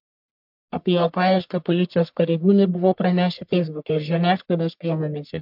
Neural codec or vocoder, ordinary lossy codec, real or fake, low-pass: codec, 44.1 kHz, 1.7 kbps, Pupu-Codec; MP3, 48 kbps; fake; 5.4 kHz